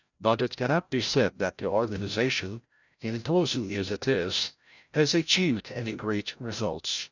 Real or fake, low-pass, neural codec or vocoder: fake; 7.2 kHz; codec, 16 kHz, 0.5 kbps, FreqCodec, larger model